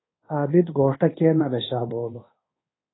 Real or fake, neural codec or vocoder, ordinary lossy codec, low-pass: fake; codec, 24 kHz, 1.2 kbps, DualCodec; AAC, 16 kbps; 7.2 kHz